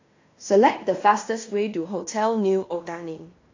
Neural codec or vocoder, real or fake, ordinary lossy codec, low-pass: codec, 16 kHz in and 24 kHz out, 0.9 kbps, LongCat-Audio-Codec, fine tuned four codebook decoder; fake; AAC, 48 kbps; 7.2 kHz